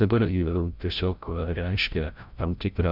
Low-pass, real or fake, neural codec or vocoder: 5.4 kHz; fake; codec, 16 kHz, 0.5 kbps, FreqCodec, larger model